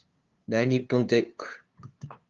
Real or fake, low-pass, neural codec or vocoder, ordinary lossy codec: fake; 7.2 kHz; codec, 16 kHz, 2 kbps, FunCodec, trained on LibriTTS, 25 frames a second; Opus, 32 kbps